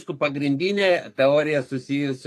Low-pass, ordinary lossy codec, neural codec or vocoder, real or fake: 14.4 kHz; AAC, 64 kbps; codec, 44.1 kHz, 3.4 kbps, Pupu-Codec; fake